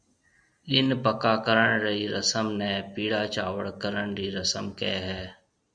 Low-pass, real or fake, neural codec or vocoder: 9.9 kHz; real; none